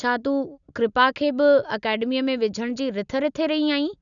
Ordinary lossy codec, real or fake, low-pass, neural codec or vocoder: none; real; 7.2 kHz; none